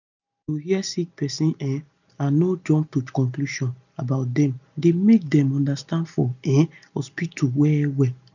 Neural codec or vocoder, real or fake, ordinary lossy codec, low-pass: none; real; none; 7.2 kHz